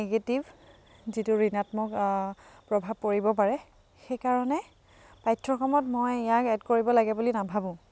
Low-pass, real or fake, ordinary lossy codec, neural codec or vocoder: none; real; none; none